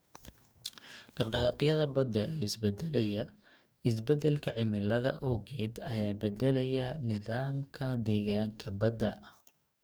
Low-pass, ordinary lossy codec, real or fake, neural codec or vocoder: none; none; fake; codec, 44.1 kHz, 2.6 kbps, DAC